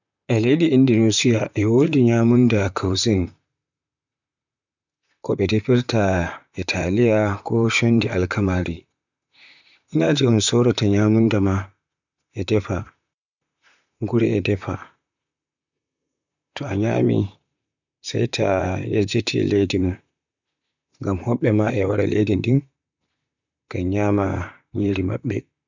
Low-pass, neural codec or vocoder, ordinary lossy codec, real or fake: 7.2 kHz; vocoder, 44.1 kHz, 128 mel bands, Pupu-Vocoder; none; fake